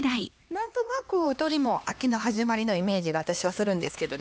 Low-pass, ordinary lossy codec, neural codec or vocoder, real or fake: none; none; codec, 16 kHz, 2 kbps, X-Codec, HuBERT features, trained on LibriSpeech; fake